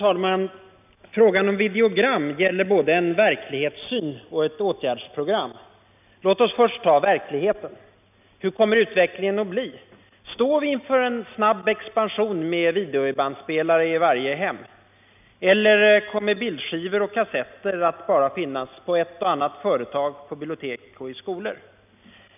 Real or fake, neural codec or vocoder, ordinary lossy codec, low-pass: real; none; none; 3.6 kHz